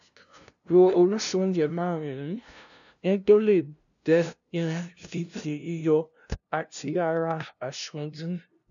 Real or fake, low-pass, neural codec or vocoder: fake; 7.2 kHz; codec, 16 kHz, 0.5 kbps, FunCodec, trained on LibriTTS, 25 frames a second